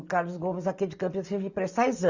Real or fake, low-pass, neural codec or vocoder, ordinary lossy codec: real; 7.2 kHz; none; Opus, 64 kbps